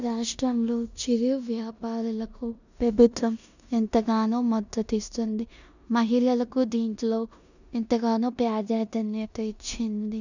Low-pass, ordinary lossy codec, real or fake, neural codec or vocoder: 7.2 kHz; none; fake; codec, 16 kHz in and 24 kHz out, 0.9 kbps, LongCat-Audio-Codec, four codebook decoder